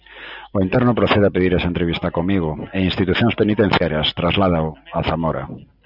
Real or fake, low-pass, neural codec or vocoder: real; 5.4 kHz; none